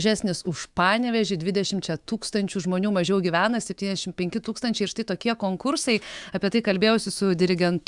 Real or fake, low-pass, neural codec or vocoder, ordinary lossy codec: fake; 10.8 kHz; autoencoder, 48 kHz, 128 numbers a frame, DAC-VAE, trained on Japanese speech; Opus, 64 kbps